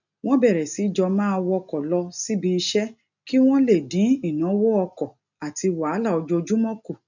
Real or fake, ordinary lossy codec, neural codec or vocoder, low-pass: real; none; none; 7.2 kHz